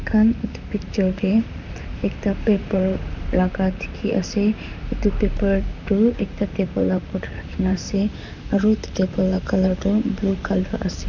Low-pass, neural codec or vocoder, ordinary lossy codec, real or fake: 7.2 kHz; vocoder, 44.1 kHz, 128 mel bands, Pupu-Vocoder; none; fake